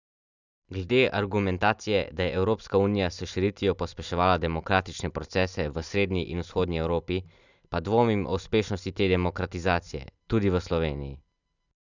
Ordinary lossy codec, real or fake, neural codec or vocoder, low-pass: none; real; none; 7.2 kHz